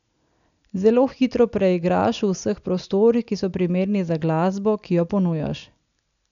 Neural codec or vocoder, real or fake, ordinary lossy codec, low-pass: none; real; none; 7.2 kHz